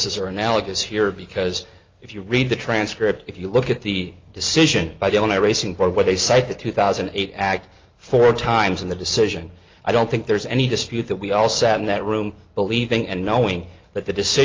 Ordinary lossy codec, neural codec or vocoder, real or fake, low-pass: Opus, 32 kbps; none; real; 7.2 kHz